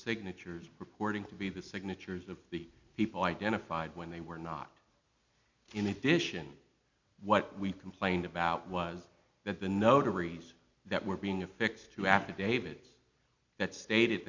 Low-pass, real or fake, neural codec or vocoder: 7.2 kHz; real; none